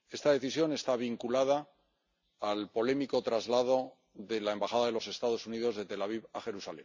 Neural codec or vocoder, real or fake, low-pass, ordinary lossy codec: none; real; 7.2 kHz; MP3, 64 kbps